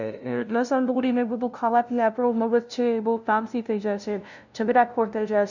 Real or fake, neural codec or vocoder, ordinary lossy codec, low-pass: fake; codec, 16 kHz, 0.5 kbps, FunCodec, trained on LibriTTS, 25 frames a second; none; 7.2 kHz